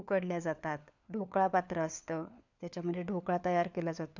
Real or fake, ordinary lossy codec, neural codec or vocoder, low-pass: fake; none; codec, 16 kHz, 4 kbps, FunCodec, trained on LibriTTS, 50 frames a second; 7.2 kHz